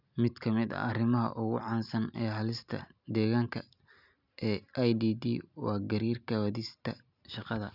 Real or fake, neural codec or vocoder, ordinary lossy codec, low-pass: real; none; none; 5.4 kHz